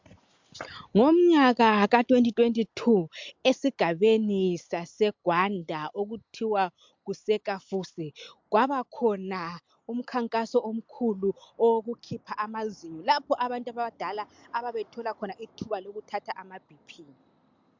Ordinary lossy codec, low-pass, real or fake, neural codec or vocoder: MP3, 64 kbps; 7.2 kHz; real; none